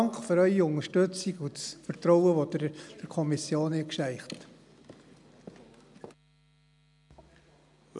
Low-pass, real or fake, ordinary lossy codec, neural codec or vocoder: 10.8 kHz; real; none; none